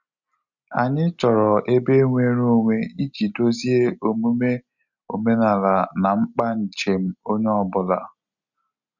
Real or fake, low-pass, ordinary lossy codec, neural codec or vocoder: real; 7.2 kHz; none; none